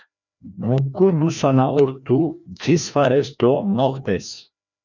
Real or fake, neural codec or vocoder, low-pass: fake; codec, 16 kHz, 1 kbps, FreqCodec, larger model; 7.2 kHz